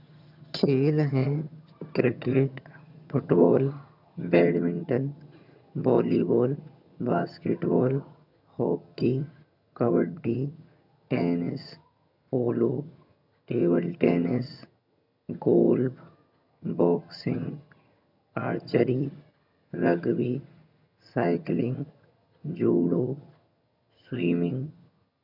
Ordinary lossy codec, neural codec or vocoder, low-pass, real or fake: none; vocoder, 22.05 kHz, 80 mel bands, HiFi-GAN; 5.4 kHz; fake